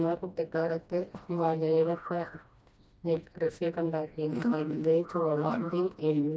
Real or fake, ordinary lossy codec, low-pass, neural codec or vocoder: fake; none; none; codec, 16 kHz, 1 kbps, FreqCodec, smaller model